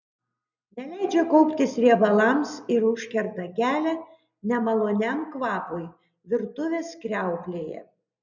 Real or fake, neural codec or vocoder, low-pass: real; none; 7.2 kHz